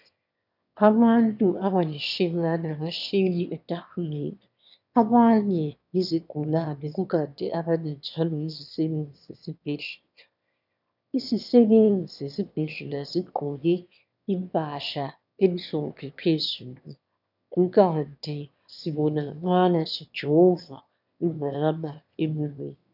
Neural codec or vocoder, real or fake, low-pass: autoencoder, 22.05 kHz, a latent of 192 numbers a frame, VITS, trained on one speaker; fake; 5.4 kHz